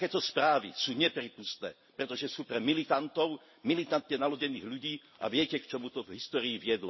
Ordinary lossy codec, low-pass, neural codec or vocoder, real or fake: MP3, 24 kbps; 7.2 kHz; none; real